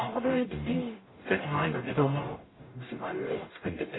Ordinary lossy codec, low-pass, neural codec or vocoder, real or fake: AAC, 16 kbps; 7.2 kHz; codec, 44.1 kHz, 0.9 kbps, DAC; fake